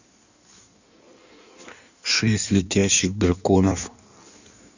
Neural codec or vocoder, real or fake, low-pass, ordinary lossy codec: codec, 16 kHz in and 24 kHz out, 1.1 kbps, FireRedTTS-2 codec; fake; 7.2 kHz; none